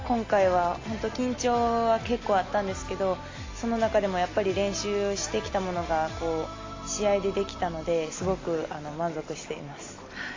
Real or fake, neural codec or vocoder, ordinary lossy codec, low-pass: real; none; AAC, 32 kbps; 7.2 kHz